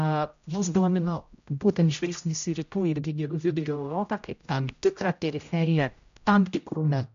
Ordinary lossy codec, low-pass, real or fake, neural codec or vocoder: MP3, 64 kbps; 7.2 kHz; fake; codec, 16 kHz, 0.5 kbps, X-Codec, HuBERT features, trained on general audio